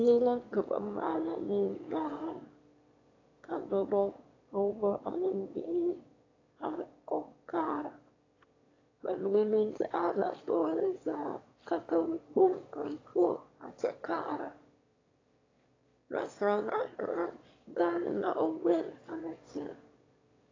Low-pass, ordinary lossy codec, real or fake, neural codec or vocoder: 7.2 kHz; AAC, 32 kbps; fake; autoencoder, 22.05 kHz, a latent of 192 numbers a frame, VITS, trained on one speaker